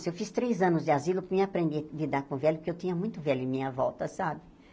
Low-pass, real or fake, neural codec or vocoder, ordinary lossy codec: none; real; none; none